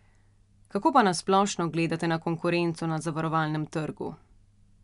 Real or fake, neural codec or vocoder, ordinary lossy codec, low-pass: real; none; MP3, 96 kbps; 10.8 kHz